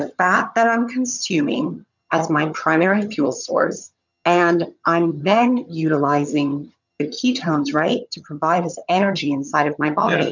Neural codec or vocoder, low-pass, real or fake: vocoder, 22.05 kHz, 80 mel bands, HiFi-GAN; 7.2 kHz; fake